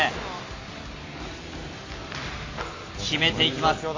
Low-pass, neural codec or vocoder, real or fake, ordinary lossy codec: 7.2 kHz; none; real; AAC, 32 kbps